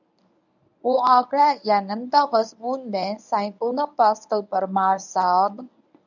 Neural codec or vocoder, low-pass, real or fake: codec, 24 kHz, 0.9 kbps, WavTokenizer, medium speech release version 1; 7.2 kHz; fake